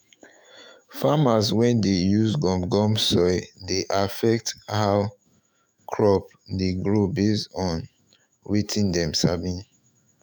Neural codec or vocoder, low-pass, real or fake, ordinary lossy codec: autoencoder, 48 kHz, 128 numbers a frame, DAC-VAE, trained on Japanese speech; none; fake; none